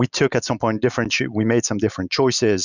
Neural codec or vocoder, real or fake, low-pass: none; real; 7.2 kHz